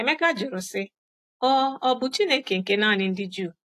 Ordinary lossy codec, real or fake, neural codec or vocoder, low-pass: AAC, 64 kbps; real; none; 14.4 kHz